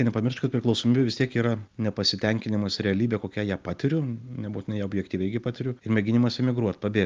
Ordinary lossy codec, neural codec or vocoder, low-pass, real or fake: Opus, 24 kbps; none; 7.2 kHz; real